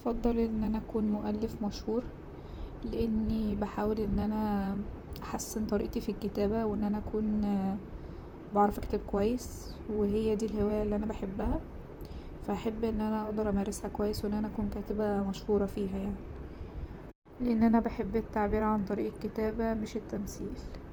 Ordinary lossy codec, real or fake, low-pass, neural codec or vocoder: none; fake; none; vocoder, 44.1 kHz, 128 mel bands, Pupu-Vocoder